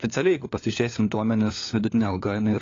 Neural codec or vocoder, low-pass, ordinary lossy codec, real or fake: codec, 16 kHz, 4 kbps, FunCodec, trained on LibriTTS, 50 frames a second; 7.2 kHz; AAC, 32 kbps; fake